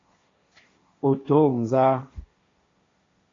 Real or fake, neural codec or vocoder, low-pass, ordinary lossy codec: fake; codec, 16 kHz, 1.1 kbps, Voila-Tokenizer; 7.2 kHz; AAC, 32 kbps